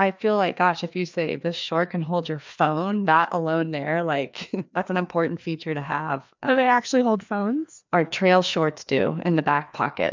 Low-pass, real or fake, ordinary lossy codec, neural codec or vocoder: 7.2 kHz; fake; MP3, 64 kbps; codec, 16 kHz, 2 kbps, FreqCodec, larger model